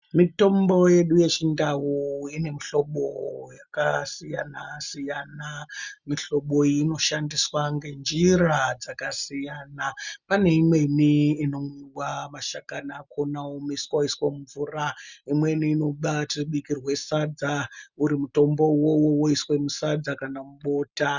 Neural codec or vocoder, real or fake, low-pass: none; real; 7.2 kHz